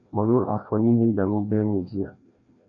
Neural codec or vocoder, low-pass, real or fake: codec, 16 kHz, 1 kbps, FreqCodec, larger model; 7.2 kHz; fake